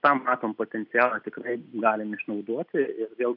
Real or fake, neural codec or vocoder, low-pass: real; none; 5.4 kHz